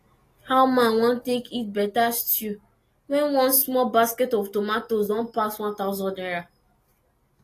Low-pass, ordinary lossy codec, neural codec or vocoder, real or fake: 14.4 kHz; AAC, 48 kbps; none; real